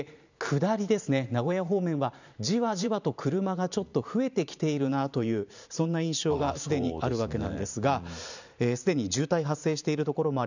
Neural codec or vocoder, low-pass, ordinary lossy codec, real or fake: vocoder, 44.1 kHz, 128 mel bands every 512 samples, BigVGAN v2; 7.2 kHz; none; fake